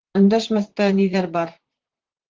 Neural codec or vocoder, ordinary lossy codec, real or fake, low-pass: vocoder, 44.1 kHz, 128 mel bands, Pupu-Vocoder; Opus, 16 kbps; fake; 7.2 kHz